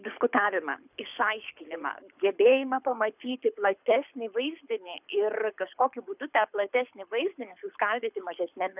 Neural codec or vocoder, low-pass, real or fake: codec, 24 kHz, 6 kbps, HILCodec; 3.6 kHz; fake